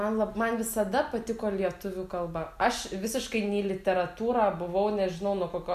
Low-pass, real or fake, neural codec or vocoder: 14.4 kHz; real; none